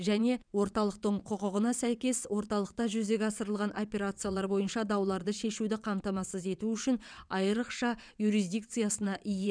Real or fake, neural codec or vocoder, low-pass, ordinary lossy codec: fake; vocoder, 22.05 kHz, 80 mel bands, WaveNeXt; 9.9 kHz; none